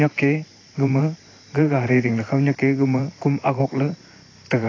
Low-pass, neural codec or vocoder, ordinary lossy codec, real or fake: 7.2 kHz; vocoder, 44.1 kHz, 128 mel bands every 512 samples, BigVGAN v2; AAC, 32 kbps; fake